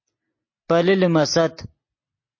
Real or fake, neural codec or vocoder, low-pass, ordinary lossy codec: real; none; 7.2 kHz; MP3, 32 kbps